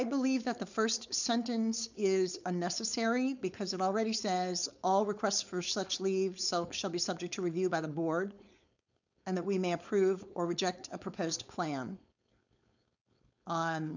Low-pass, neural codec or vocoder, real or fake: 7.2 kHz; codec, 16 kHz, 4.8 kbps, FACodec; fake